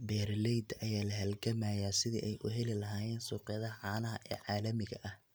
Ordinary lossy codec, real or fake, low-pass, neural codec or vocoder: none; real; none; none